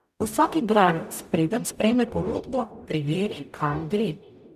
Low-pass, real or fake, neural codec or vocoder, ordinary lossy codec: 14.4 kHz; fake; codec, 44.1 kHz, 0.9 kbps, DAC; none